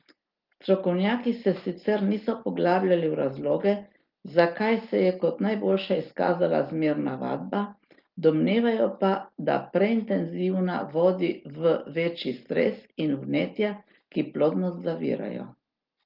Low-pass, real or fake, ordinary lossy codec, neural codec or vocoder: 5.4 kHz; real; Opus, 32 kbps; none